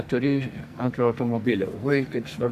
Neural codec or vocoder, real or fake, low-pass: codec, 44.1 kHz, 2.6 kbps, SNAC; fake; 14.4 kHz